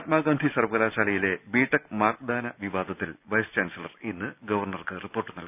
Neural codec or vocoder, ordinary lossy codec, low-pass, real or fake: none; none; 3.6 kHz; real